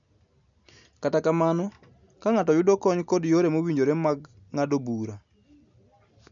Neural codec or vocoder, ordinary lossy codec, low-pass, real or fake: none; none; 7.2 kHz; real